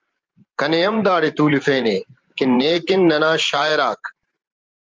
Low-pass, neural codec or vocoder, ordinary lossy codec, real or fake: 7.2 kHz; vocoder, 44.1 kHz, 128 mel bands every 512 samples, BigVGAN v2; Opus, 24 kbps; fake